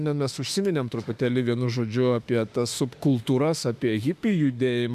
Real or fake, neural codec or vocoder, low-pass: fake; autoencoder, 48 kHz, 32 numbers a frame, DAC-VAE, trained on Japanese speech; 14.4 kHz